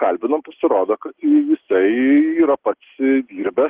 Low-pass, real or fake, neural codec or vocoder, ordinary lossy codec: 3.6 kHz; real; none; Opus, 16 kbps